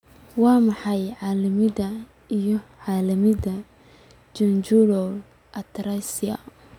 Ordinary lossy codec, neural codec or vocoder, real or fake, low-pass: none; none; real; 19.8 kHz